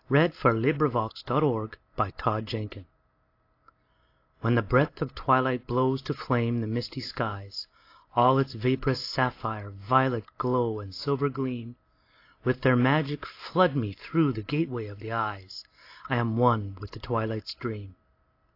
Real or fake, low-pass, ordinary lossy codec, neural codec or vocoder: real; 5.4 kHz; AAC, 32 kbps; none